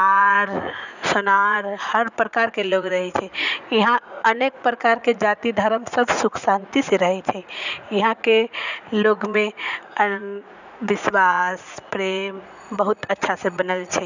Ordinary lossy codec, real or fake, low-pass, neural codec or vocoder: none; fake; 7.2 kHz; vocoder, 44.1 kHz, 80 mel bands, Vocos